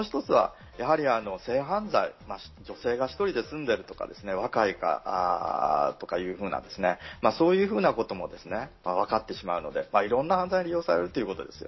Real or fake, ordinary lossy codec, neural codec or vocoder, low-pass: real; MP3, 24 kbps; none; 7.2 kHz